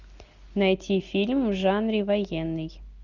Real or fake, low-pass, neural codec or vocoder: real; 7.2 kHz; none